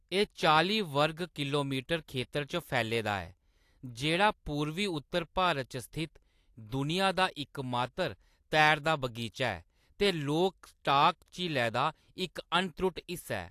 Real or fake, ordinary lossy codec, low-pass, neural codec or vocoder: real; AAC, 64 kbps; 14.4 kHz; none